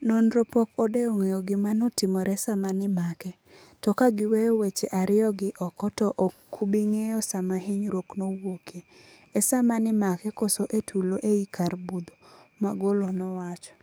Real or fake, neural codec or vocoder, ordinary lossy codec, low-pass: fake; codec, 44.1 kHz, 7.8 kbps, DAC; none; none